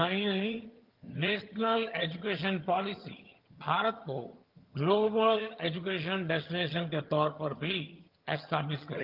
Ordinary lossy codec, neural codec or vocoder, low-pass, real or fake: Opus, 32 kbps; vocoder, 22.05 kHz, 80 mel bands, HiFi-GAN; 5.4 kHz; fake